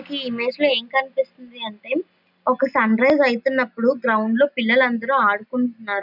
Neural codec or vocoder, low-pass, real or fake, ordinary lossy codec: none; 5.4 kHz; real; none